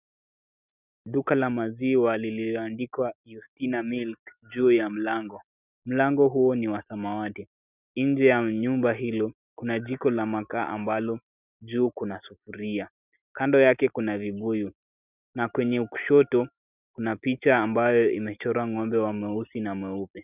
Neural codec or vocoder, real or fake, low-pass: none; real; 3.6 kHz